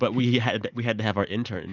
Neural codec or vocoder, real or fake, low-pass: none; real; 7.2 kHz